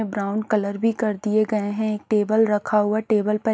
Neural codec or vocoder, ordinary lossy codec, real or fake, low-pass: none; none; real; none